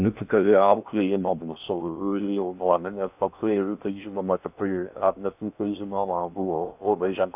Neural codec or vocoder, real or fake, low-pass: codec, 16 kHz in and 24 kHz out, 0.6 kbps, FocalCodec, streaming, 4096 codes; fake; 3.6 kHz